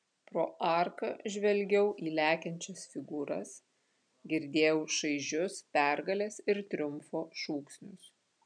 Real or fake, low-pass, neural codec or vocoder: real; 9.9 kHz; none